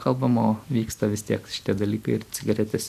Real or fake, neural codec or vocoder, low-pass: real; none; 14.4 kHz